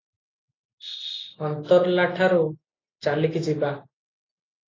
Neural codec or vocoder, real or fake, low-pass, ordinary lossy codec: none; real; 7.2 kHz; AAC, 32 kbps